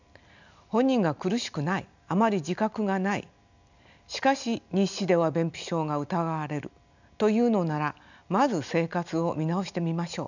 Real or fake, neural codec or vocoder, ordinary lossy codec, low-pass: real; none; none; 7.2 kHz